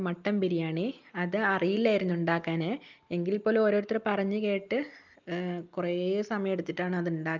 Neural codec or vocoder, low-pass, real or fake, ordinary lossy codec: none; 7.2 kHz; real; Opus, 24 kbps